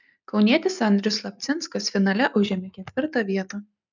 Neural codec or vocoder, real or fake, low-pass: none; real; 7.2 kHz